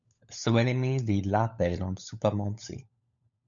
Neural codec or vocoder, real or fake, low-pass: codec, 16 kHz, 16 kbps, FunCodec, trained on LibriTTS, 50 frames a second; fake; 7.2 kHz